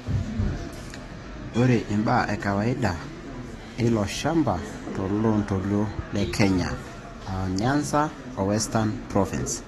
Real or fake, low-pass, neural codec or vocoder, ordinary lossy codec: fake; 19.8 kHz; autoencoder, 48 kHz, 128 numbers a frame, DAC-VAE, trained on Japanese speech; AAC, 32 kbps